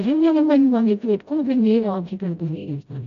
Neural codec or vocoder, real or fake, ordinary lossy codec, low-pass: codec, 16 kHz, 0.5 kbps, FreqCodec, smaller model; fake; none; 7.2 kHz